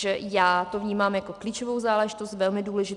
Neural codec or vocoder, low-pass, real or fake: none; 10.8 kHz; real